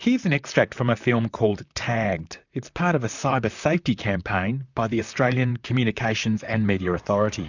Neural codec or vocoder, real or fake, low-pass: vocoder, 44.1 kHz, 128 mel bands, Pupu-Vocoder; fake; 7.2 kHz